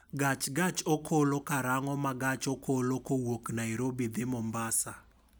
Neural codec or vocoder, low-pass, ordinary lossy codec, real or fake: none; none; none; real